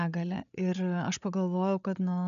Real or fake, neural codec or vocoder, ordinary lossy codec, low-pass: fake; codec, 16 kHz, 8 kbps, FreqCodec, larger model; MP3, 96 kbps; 7.2 kHz